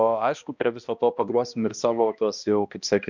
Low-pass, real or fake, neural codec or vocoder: 7.2 kHz; fake; codec, 16 kHz, 1 kbps, X-Codec, HuBERT features, trained on balanced general audio